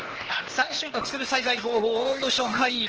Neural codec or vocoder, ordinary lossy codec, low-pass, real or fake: codec, 16 kHz, 0.8 kbps, ZipCodec; Opus, 16 kbps; 7.2 kHz; fake